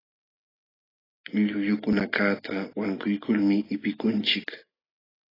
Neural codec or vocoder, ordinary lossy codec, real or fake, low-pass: none; AAC, 24 kbps; real; 5.4 kHz